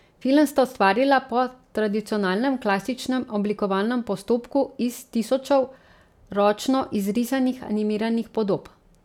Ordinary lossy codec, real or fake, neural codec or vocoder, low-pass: none; real; none; 19.8 kHz